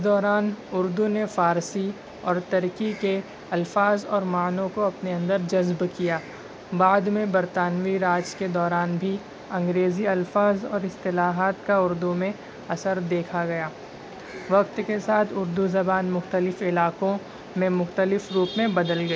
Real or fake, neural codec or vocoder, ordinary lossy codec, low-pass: real; none; none; none